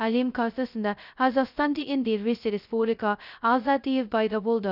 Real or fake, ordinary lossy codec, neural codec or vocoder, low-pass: fake; none; codec, 16 kHz, 0.2 kbps, FocalCodec; 5.4 kHz